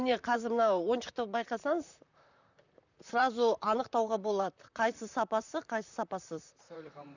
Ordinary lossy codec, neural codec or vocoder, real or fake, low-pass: none; vocoder, 44.1 kHz, 128 mel bands, Pupu-Vocoder; fake; 7.2 kHz